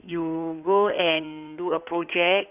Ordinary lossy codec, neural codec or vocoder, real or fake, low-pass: none; codec, 16 kHz in and 24 kHz out, 2.2 kbps, FireRedTTS-2 codec; fake; 3.6 kHz